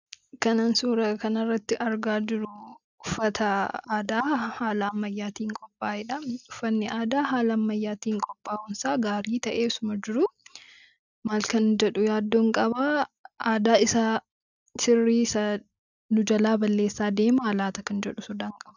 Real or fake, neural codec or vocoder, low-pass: real; none; 7.2 kHz